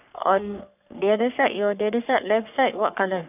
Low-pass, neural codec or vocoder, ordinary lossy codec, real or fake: 3.6 kHz; codec, 44.1 kHz, 3.4 kbps, Pupu-Codec; none; fake